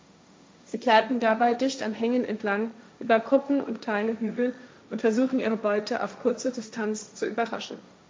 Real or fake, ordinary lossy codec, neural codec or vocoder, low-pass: fake; none; codec, 16 kHz, 1.1 kbps, Voila-Tokenizer; none